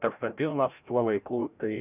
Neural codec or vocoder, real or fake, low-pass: codec, 16 kHz, 0.5 kbps, FreqCodec, larger model; fake; 3.6 kHz